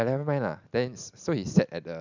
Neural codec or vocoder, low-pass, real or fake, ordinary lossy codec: none; 7.2 kHz; real; none